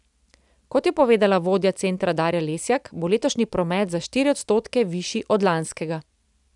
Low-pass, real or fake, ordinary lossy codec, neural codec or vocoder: 10.8 kHz; real; none; none